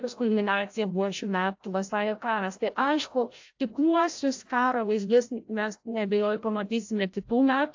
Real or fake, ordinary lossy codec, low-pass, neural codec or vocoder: fake; AAC, 48 kbps; 7.2 kHz; codec, 16 kHz, 0.5 kbps, FreqCodec, larger model